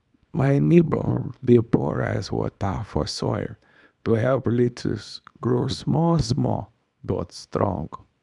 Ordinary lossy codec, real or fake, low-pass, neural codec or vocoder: none; fake; 10.8 kHz; codec, 24 kHz, 0.9 kbps, WavTokenizer, small release